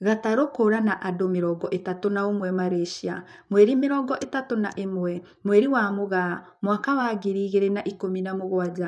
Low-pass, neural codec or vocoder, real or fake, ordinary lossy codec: none; vocoder, 24 kHz, 100 mel bands, Vocos; fake; none